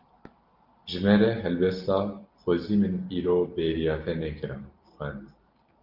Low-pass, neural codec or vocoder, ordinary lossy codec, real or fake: 5.4 kHz; none; Opus, 24 kbps; real